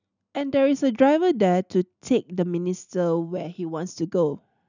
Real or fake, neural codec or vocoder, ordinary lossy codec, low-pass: real; none; none; 7.2 kHz